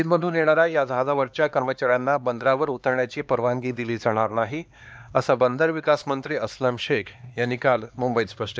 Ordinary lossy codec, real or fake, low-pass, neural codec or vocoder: none; fake; none; codec, 16 kHz, 2 kbps, X-Codec, HuBERT features, trained on LibriSpeech